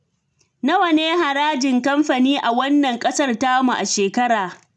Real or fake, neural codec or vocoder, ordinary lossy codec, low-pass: real; none; none; none